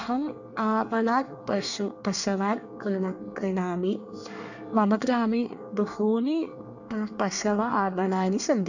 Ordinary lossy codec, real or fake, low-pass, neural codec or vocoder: none; fake; 7.2 kHz; codec, 24 kHz, 1 kbps, SNAC